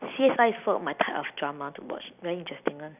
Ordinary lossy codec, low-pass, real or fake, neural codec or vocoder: none; 3.6 kHz; fake; vocoder, 44.1 kHz, 128 mel bands every 256 samples, BigVGAN v2